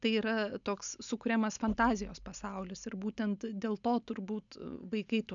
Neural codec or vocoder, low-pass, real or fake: none; 7.2 kHz; real